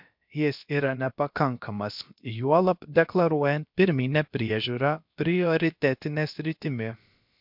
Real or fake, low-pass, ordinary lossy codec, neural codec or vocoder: fake; 5.4 kHz; MP3, 48 kbps; codec, 16 kHz, about 1 kbps, DyCAST, with the encoder's durations